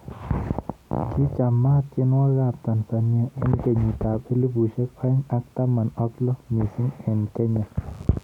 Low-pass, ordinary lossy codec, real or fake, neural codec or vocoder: 19.8 kHz; none; real; none